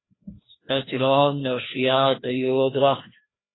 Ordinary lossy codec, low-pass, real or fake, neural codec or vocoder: AAC, 16 kbps; 7.2 kHz; fake; codec, 16 kHz, 1 kbps, FreqCodec, larger model